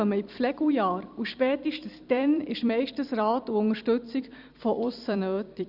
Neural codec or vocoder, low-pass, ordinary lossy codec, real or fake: vocoder, 44.1 kHz, 128 mel bands every 256 samples, BigVGAN v2; 5.4 kHz; Opus, 64 kbps; fake